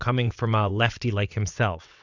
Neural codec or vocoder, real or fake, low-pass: vocoder, 22.05 kHz, 80 mel bands, WaveNeXt; fake; 7.2 kHz